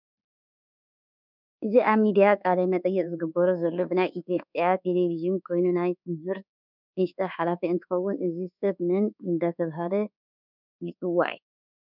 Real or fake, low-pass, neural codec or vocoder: fake; 5.4 kHz; codec, 24 kHz, 1.2 kbps, DualCodec